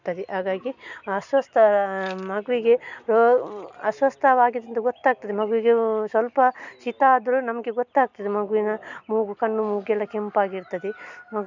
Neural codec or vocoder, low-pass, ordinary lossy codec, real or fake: none; 7.2 kHz; none; real